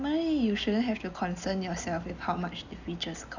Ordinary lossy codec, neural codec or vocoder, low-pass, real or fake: none; none; 7.2 kHz; real